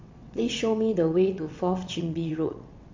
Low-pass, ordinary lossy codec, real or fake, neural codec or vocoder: 7.2 kHz; MP3, 48 kbps; fake; vocoder, 22.05 kHz, 80 mel bands, WaveNeXt